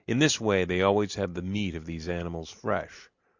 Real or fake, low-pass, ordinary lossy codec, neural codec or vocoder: real; 7.2 kHz; Opus, 64 kbps; none